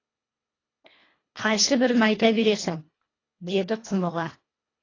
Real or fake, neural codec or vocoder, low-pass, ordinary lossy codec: fake; codec, 24 kHz, 1.5 kbps, HILCodec; 7.2 kHz; AAC, 32 kbps